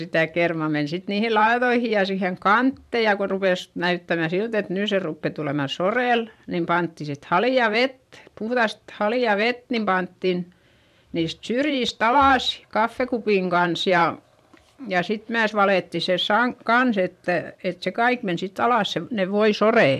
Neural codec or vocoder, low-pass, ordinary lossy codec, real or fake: vocoder, 44.1 kHz, 128 mel bands every 512 samples, BigVGAN v2; 14.4 kHz; MP3, 96 kbps; fake